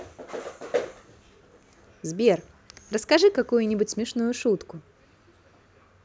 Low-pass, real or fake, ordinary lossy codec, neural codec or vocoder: none; real; none; none